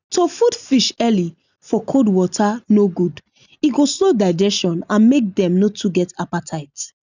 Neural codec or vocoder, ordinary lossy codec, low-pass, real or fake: none; none; 7.2 kHz; real